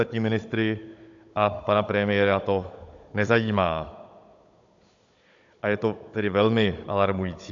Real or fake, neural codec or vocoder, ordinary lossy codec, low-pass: fake; codec, 16 kHz, 16 kbps, FunCodec, trained on Chinese and English, 50 frames a second; AAC, 64 kbps; 7.2 kHz